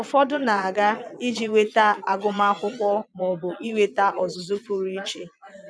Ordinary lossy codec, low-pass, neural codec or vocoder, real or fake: none; none; vocoder, 22.05 kHz, 80 mel bands, Vocos; fake